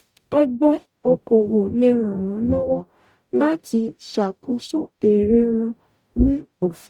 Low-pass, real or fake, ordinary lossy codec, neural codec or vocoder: 19.8 kHz; fake; Opus, 64 kbps; codec, 44.1 kHz, 0.9 kbps, DAC